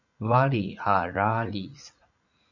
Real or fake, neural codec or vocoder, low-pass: fake; vocoder, 44.1 kHz, 80 mel bands, Vocos; 7.2 kHz